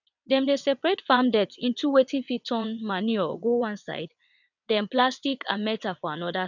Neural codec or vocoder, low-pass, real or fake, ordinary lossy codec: vocoder, 22.05 kHz, 80 mel bands, WaveNeXt; 7.2 kHz; fake; none